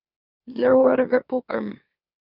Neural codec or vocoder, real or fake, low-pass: autoencoder, 44.1 kHz, a latent of 192 numbers a frame, MeloTTS; fake; 5.4 kHz